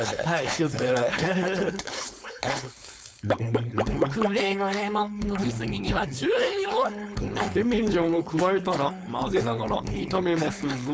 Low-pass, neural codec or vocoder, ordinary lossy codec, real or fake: none; codec, 16 kHz, 4.8 kbps, FACodec; none; fake